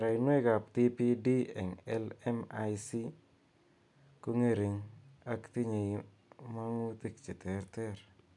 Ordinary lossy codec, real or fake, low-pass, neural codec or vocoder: none; real; 10.8 kHz; none